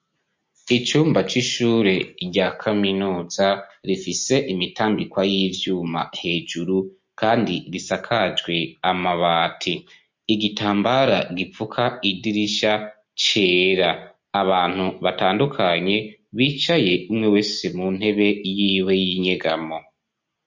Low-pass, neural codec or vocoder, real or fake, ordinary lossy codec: 7.2 kHz; none; real; MP3, 48 kbps